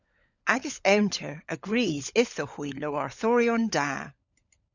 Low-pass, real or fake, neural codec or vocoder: 7.2 kHz; fake; codec, 16 kHz, 16 kbps, FunCodec, trained on LibriTTS, 50 frames a second